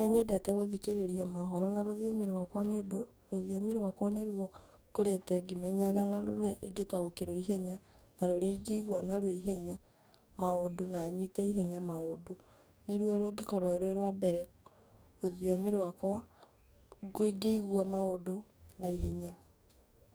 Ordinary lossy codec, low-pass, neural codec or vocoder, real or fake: none; none; codec, 44.1 kHz, 2.6 kbps, DAC; fake